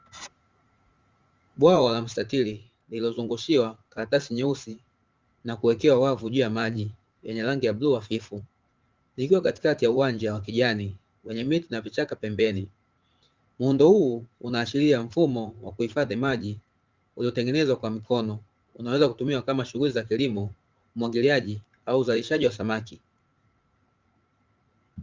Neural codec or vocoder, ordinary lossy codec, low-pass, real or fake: vocoder, 44.1 kHz, 80 mel bands, Vocos; Opus, 32 kbps; 7.2 kHz; fake